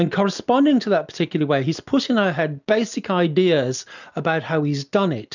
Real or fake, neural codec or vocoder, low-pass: real; none; 7.2 kHz